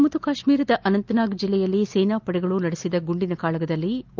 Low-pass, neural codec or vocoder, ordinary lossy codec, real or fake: 7.2 kHz; none; Opus, 32 kbps; real